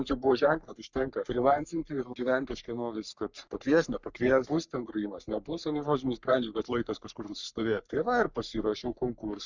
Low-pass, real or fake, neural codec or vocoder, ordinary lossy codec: 7.2 kHz; fake; codec, 44.1 kHz, 3.4 kbps, Pupu-Codec; Opus, 64 kbps